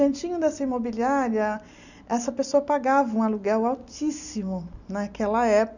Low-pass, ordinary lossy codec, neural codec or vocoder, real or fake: 7.2 kHz; none; none; real